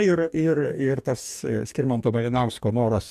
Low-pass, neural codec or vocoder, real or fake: 14.4 kHz; codec, 44.1 kHz, 2.6 kbps, DAC; fake